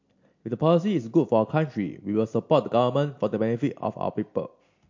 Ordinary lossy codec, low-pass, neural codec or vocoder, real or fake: MP3, 48 kbps; 7.2 kHz; none; real